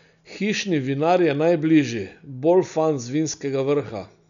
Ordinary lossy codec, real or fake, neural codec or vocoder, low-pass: none; real; none; 7.2 kHz